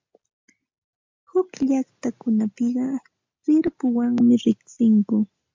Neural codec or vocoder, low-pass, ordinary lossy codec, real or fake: none; 7.2 kHz; MP3, 64 kbps; real